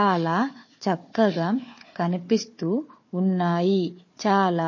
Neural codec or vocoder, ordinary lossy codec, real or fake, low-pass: codec, 16 kHz, 4 kbps, FunCodec, trained on Chinese and English, 50 frames a second; MP3, 32 kbps; fake; 7.2 kHz